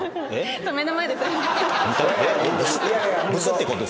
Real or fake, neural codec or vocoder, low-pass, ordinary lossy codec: real; none; none; none